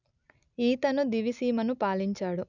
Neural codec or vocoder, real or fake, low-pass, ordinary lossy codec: none; real; 7.2 kHz; none